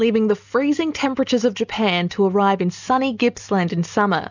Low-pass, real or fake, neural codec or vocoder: 7.2 kHz; real; none